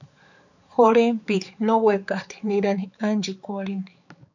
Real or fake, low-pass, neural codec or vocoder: fake; 7.2 kHz; codec, 16 kHz, 4 kbps, X-Codec, HuBERT features, trained on balanced general audio